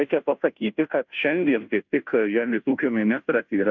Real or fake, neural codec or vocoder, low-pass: fake; codec, 16 kHz, 0.5 kbps, FunCodec, trained on Chinese and English, 25 frames a second; 7.2 kHz